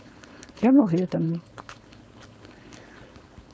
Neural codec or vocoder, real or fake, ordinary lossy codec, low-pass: codec, 16 kHz, 4.8 kbps, FACodec; fake; none; none